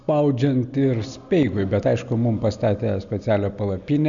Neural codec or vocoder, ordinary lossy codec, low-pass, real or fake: none; MP3, 96 kbps; 7.2 kHz; real